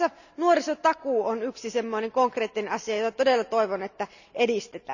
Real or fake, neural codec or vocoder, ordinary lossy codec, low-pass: real; none; none; 7.2 kHz